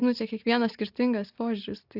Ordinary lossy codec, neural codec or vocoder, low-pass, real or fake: Opus, 64 kbps; none; 5.4 kHz; real